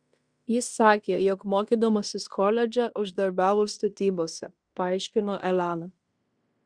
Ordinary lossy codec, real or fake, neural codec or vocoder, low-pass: Opus, 64 kbps; fake; codec, 16 kHz in and 24 kHz out, 0.9 kbps, LongCat-Audio-Codec, fine tuned four codebook decoder; 9.9 kHz